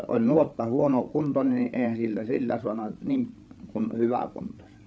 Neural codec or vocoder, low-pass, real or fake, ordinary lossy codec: codec, 16 kHz, 8 kbps, FreqCodec, larger model; none; fake; none